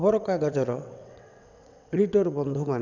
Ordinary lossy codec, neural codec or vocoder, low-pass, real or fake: none; vocoder, 22.05 kHz, 80 mel bands, WaveNeXt; 7.2 kHz; fake